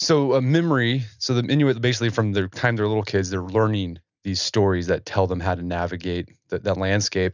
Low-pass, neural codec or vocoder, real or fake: 7.2 kHz; none; real